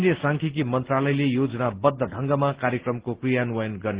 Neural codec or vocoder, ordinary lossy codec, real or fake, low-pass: none; Opus, 16 kbps; real; 3.6 kHz